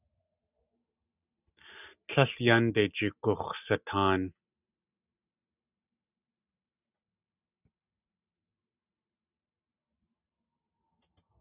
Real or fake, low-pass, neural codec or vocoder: real; 3.6 kHz; none